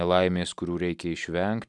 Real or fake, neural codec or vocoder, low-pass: real; none; 10.8 kHz